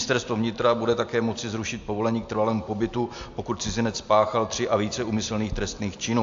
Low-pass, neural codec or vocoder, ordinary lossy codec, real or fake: 7.2 kHz; none; AAC, 64 kbps; real